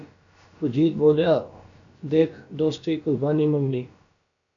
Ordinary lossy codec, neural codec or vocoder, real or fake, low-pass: AAC, 64 kbps; codec, 16 kHz, about 1 kbps, DyCAST, with the encoder's durations; fake; 7.2 kHz